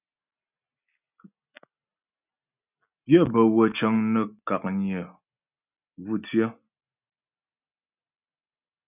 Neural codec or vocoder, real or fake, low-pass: none; real; 3.6 kHz